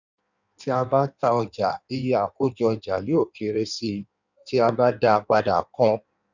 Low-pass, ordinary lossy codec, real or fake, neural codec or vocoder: 7.2 kHz; none; fake; codec, 16 kHz in and 24 kHz out, 1.1 kbps, FireRedTTS-2 codec